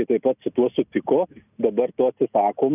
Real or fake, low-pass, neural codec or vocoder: real; 3.6 kHz; none